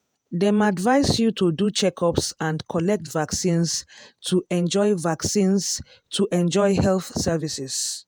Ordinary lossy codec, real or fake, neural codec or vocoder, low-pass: none; fake; vocoder, 48 kHz, 128 mel bands, Vocos; none